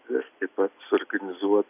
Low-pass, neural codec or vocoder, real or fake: 3.6 kHz; none; real